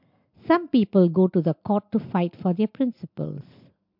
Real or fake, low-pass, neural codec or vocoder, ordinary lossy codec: real; 5.4 kHz; none; MP3, 48 kbps